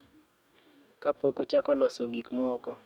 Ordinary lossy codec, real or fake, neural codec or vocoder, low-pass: none; fake; codec, 44.1 kHz, 2.6 kbps, DAC; 19.8 kHz